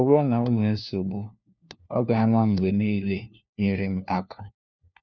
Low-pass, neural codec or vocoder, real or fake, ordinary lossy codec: 7.2 kHz; codec, 16 kHz, 1 kbps, FunCodec, trained on LibriTTS, 50 frames a second; fake; none